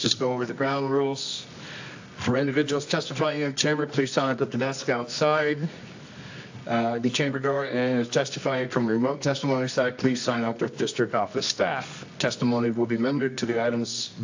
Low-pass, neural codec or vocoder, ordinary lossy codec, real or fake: 7.2 kHz; codec, 24 kHz, 0.9 kbps, WavTokenizer, medium music audio release; AAC, 48 kbps; fake